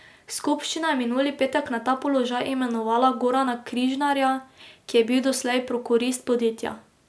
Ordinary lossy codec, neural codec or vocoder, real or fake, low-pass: none; none; real; none